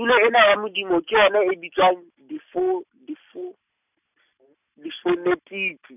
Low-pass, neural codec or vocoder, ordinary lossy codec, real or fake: 3.6 kHz; none; none; real